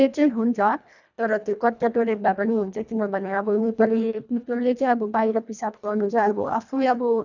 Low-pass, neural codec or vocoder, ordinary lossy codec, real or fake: 7.2 kHz; codec, 24 kHz, 1.5 kbps, HILCodec; none; fake